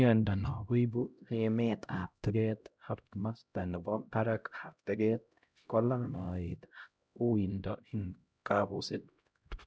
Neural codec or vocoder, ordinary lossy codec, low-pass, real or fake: codec, 16 kHz, 0.5 kbps, X-Codec, HuBERT features, trained on LibriSpeech; none; none; fake